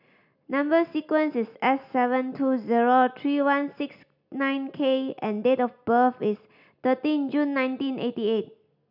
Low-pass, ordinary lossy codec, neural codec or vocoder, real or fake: 5.4 kHz; none; none; real